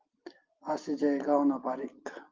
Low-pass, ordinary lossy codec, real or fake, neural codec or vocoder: 7.2 kHz; Opus, 32 kbps; real; none